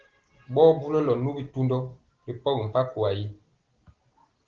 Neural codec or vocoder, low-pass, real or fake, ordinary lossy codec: none; 7.2 kHz; real; Opus, 16 kbps